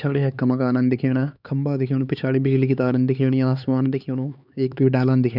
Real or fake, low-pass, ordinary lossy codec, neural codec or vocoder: fake; 5.4 kHz; none; codec, 16 kHz, 4 kbps, X-Codec, HuBERT features, trained on LibriSpeech